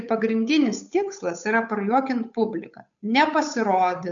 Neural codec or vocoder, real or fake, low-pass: codec, 16 kHz, 8 kbps, FunCodec, trained on Chinese and English, 25 frames a second; fake; 7.2 kHz